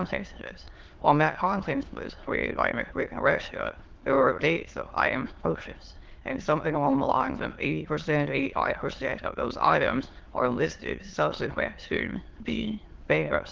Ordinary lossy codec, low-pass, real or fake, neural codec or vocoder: Opus, 16 kbps; 7.2 kHz; fake; autoencoder, 22.05 kHz, a latent of 192 numbers a frame, VITS, trained on many speakers